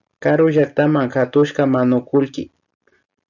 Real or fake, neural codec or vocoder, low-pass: real; none; 7.2 kHz